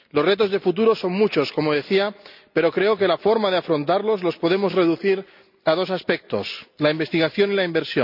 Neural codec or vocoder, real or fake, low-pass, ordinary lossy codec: none; real; 5.4 kHz; none